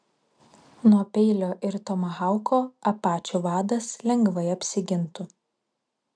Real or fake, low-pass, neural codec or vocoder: real; 9.9 kHz; none